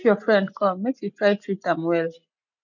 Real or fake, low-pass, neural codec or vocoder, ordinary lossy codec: real; 7.2 kHz; none; none